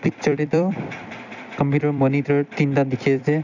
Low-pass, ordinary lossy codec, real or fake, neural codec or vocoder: 7.2 kHz; none; fake; vocoder, 44.1 kHz, 128 mel bands every 256 samples, BigVGAN v2